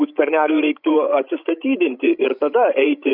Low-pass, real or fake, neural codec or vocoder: 5.4 kHz; fake; codec, 16 kHz, 16 kbps, FreqCodec, larger model